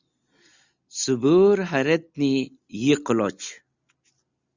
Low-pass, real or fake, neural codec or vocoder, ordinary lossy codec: 7.2 kHz; real; none; Opus, 64 kbps